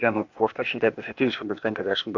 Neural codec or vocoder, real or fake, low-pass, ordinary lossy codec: codec, 16 kHz, 0.8 kbps, ZipCodec; fake; 7.2 kHz; AAC, 48 kbps